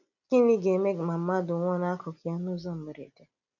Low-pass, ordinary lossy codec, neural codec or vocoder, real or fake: 7.2 kHz; none; none; real